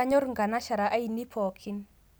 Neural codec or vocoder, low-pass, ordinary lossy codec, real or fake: none; none; none; real